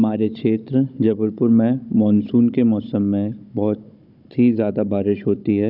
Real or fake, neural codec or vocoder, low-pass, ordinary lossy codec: fake; codec, 16 kHz, 16 kbps, FunCodec, trained on Chinese and English, 50 frames a second; 5.4 kHz; none